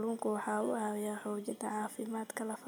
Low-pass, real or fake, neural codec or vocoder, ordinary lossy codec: none; real; none; none